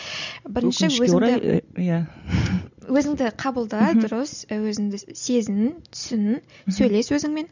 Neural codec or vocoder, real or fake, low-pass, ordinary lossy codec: none; real; 7.2 kHz; none